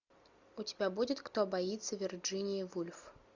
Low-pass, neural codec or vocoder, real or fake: 7.2 kHz; none; real